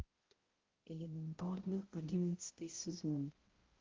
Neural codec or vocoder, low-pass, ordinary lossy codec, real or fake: codec, 16 kHz, 0.5 kbps, X-Codec, HuBERT features, trained on balanced general audio; 7.2 kHz; Opus, 16 kbps; fake